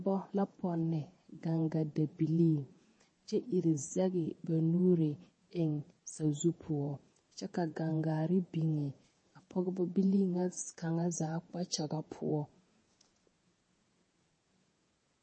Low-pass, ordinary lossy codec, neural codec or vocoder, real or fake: 9.9 kHz; MP3, 32 kbps; vocoder, 48 kHz, 128 mel bands, Vocos; fake